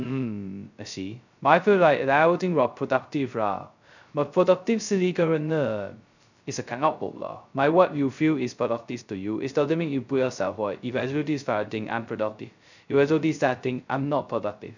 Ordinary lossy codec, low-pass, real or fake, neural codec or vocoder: none; 7.2 kHz; fake; codec, 16 kHz, 0.2 kbps, FocalCodec